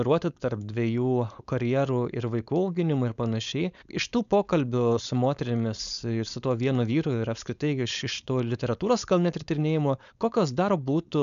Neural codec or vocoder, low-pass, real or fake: codec, 16 kHz, 4.8 kbps, FACodec; 7.2 kHz; fake